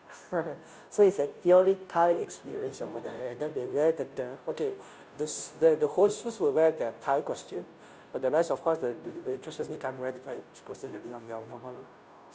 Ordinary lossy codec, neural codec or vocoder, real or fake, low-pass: none; codec, 16 kHz, 0.5 kbps, FunCodec, trained on Chinese and English, 25 frames a second; fake; none